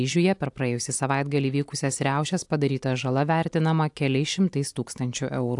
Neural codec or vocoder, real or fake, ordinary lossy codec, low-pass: none; real; AAC, 64 kbps; 10.8 kHz